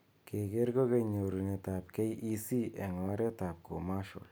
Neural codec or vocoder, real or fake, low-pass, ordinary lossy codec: none; real; none; none